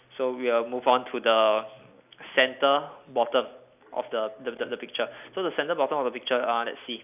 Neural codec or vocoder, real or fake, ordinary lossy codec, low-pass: none; real; none; 3.6 kHz